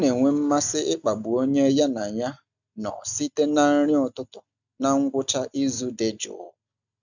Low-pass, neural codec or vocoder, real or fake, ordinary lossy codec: 7.2 kHz; none; real; none